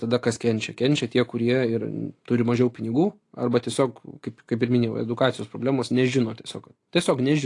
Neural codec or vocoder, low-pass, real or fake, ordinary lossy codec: none; 10.8 kHz; real; AAC, 48 kbps